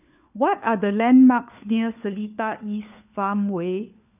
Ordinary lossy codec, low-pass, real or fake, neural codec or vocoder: none; 3.6 kHz; fake; codec, 16 kHz, 4 kbps, FunCodec, trained on LibriTTS, 50 frames a second